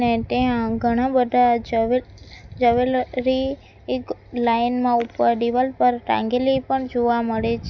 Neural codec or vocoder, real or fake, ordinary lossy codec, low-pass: none; real; none; 7.2 kHz